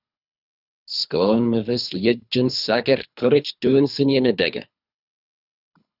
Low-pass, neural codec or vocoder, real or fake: 5.4 kHz; codec, 24 kHz, 3 kbps, HILCodec; fake